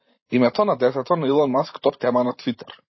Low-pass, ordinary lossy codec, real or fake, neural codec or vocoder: 7.2 kHz; MP3, 24 kbps; fake; vocoder, 44.1 kHz, 128 mel bands every 512 samples, BigVGAN v2